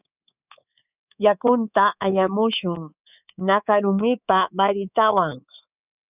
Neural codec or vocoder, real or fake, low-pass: codec, 24 kHz, 3.1 kbps, DualCodec; fake; 3.6 kHz